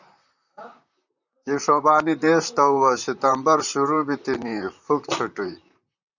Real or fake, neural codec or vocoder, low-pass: fake; vocoder, 44.1 kHz, 128 mel bands, Pupu-Vocoder; 7.2 kHz